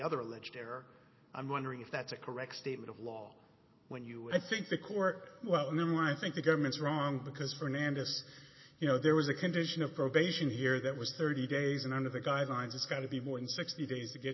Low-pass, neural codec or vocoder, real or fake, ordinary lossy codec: 7.2 kHz; none; real; MP3, 24 kbps